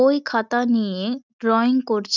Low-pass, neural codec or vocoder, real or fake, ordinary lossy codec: 7.2 kHz; none; real; none